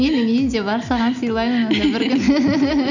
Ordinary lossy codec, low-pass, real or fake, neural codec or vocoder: none; 7.2 kHz; real; none